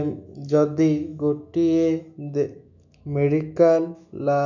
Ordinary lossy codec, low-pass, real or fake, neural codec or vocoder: none; 7.2 kHz; fake; autoencoder, 48 kHz, 128 numbers a frame, DAC-VAE, trained on Japanese speech